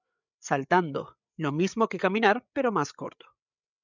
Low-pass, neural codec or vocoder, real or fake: 7.2 kHz; codec, 16 kHz, 8 kbps, FreqCodec, larger model; fake